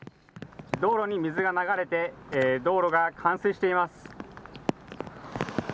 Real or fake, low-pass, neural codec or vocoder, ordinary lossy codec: real; none; none; none